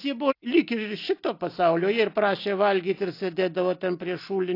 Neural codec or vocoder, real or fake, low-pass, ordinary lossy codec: none; real; 5.4 kHz; AAC, 32 kbps